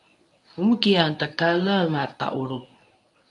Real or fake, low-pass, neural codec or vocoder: fake; 10.8 kHz; codec, 24 kHz, 0.9 kbps, WavTokenizer, medium speech release version 1